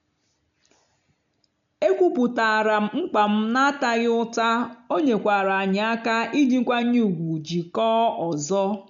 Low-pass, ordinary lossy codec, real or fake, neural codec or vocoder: 7.2 kHz; none; real; none